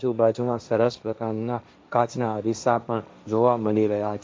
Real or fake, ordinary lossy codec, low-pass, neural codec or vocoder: fake; none; none; codec, 16 kHz, 1.1 kbps, Voila-Tokenizer